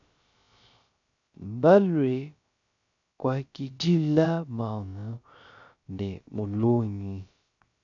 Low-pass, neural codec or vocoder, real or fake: 7.2 kHz; codec, 16 kHz, 0.3 kbps, FocalCodec; fake